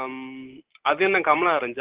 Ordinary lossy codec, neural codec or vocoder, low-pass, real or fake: Opus, 16 kbps; none; 3.6 kHz; real